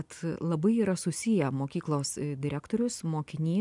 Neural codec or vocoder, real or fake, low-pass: none; real; 10.8 kHz